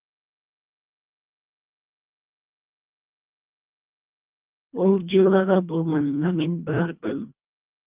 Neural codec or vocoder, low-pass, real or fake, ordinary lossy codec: codec, 24 kHz, 1.5 kbps, HILCodec; 3.6 kHz; fake; Opus, 24 kbps